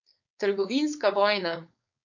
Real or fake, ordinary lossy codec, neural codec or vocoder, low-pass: fake; none; codec, 16 kHz, 4.8 kbps, FACodec; 7.2 kHz